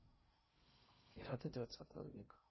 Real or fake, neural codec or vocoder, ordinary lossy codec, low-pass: fake; codec, 16 kHz in and 24 kHz out, 0.6 kbps, FocalCodec, streaming, 2048 codes; MP3, 24 kbps; 7.2 kHz